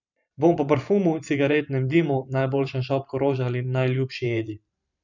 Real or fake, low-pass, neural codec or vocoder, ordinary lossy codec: fake; 7.2 kHz; vocoder, 44.1 kHz, 128 mel bands every 512 samples, BigVGAN v2; none